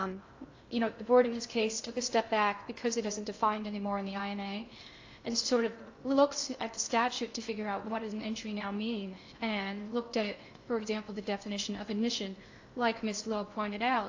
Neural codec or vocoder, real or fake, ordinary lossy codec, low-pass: codec, 16 kHz in and 24 kHz out, 0.6 kbps, FocalCodec, streaming, 2048 codes; fake; AAC, 48 kbps; 7.2 kHz